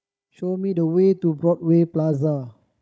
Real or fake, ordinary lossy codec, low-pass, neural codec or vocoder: fake; none; none; codec, 16 kHz, 16 kbps, FunCodec, trained on Chinese and English, 50 frames a second